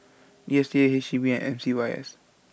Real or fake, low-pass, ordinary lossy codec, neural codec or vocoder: real; none; none; none